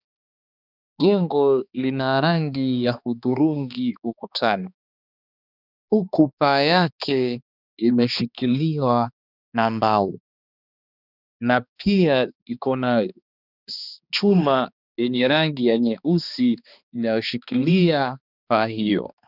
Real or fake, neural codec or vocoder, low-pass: fake; codec, 16 kHz, 2 kbps, X-Codec, HuBERT features, trained on balanced general audio; 5.4 kHz